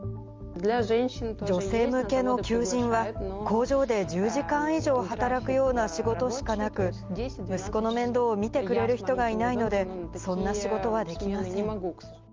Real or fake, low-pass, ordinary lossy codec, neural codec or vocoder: real; 7.2 kHz; Opus, 32 kbps; none